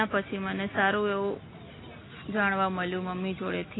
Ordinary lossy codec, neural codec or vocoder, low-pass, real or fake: AAC, 16 kbps; none; 7.2 kHz; real